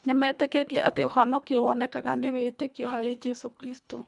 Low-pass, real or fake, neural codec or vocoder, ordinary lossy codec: 10.8 kHz; fake; codec, 24 kHz, 1.5 kbps, HILCodec; none